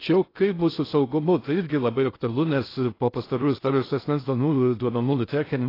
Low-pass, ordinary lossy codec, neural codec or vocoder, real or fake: 5.4 kHz; AAC, 24 kbps; codec, 16 kHz in and 24 kHz out, 0.6 kbps, FocalCodec, streaming, 2048 codes; fake